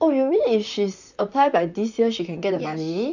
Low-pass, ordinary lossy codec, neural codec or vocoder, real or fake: 7.2 kHz; none; vocoder, 44.1 kHz, 128 mel bands, Pupu-Vocoder; fake